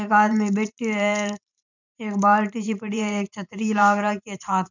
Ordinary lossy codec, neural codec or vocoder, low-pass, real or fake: none; vocoder, 44.1 kHz, 128 mel bands every 512 samples, BigVGAN v2; 7.2 kHz; fake